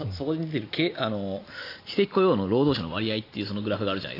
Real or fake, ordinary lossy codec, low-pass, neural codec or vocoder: real; AAC, 32 kbps; 5.4 kHz; none